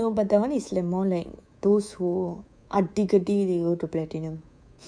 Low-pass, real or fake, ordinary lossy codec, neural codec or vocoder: 9.9 kHz; fake; none; codec, 24 kHz, 3.1 kbps, DualCodec